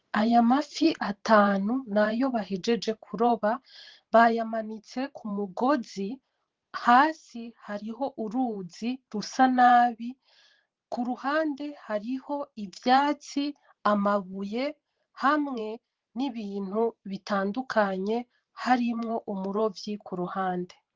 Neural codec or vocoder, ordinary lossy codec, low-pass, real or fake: vocoder, 44.1 kHz, 128 mel bands every 512 samples, BigVGAN v2; Opus, 16 kbps; 7.2 kHz; fake